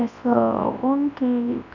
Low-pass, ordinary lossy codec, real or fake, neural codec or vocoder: 7.2 kHz; none; fake; codec, 24 kHz, 0.9 kbps, WavTokenizer, large speech release